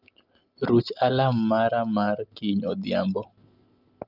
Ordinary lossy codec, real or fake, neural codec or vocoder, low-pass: Opus, 32 kbps; real; none; 5.4 kHz